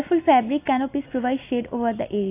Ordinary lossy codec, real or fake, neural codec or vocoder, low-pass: AAC, 24 kbps; real; none; 3.6 kHz